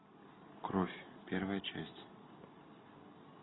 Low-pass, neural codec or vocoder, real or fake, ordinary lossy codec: 7.2 kHz; none; real; AAC, 16 kbps